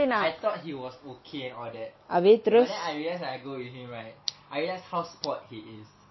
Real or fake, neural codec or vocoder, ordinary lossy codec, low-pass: real; none; MP3, 24 kbps; 7.2 kHz